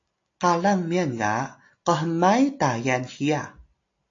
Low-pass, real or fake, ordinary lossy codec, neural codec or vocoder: 7.2 kHz; real; AAC, 48 kbps; none